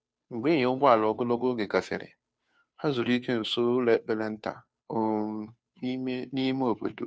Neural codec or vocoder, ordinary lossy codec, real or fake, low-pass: codec, 16 kHz, 2 kbps, FunCodec, trained on Chinese and English, 25 frames a second; none; fake; none